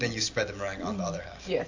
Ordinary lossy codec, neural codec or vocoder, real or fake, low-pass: AAC, 48 kbps; none; real; 7.2 kHz